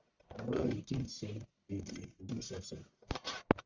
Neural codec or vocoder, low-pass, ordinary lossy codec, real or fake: codec, 44.1 kHz, 1.7 kbps, Pupu-Codec; 7.2 kHz; Opus, 64 kbps; fake